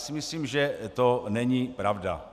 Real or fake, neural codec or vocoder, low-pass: real; none; 14.4 kHz